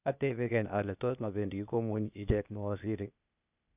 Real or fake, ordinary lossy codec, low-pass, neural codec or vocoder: fake; none; 3.6 kHz; codec, 16 kHz, 0.8 kbps, ZipCodec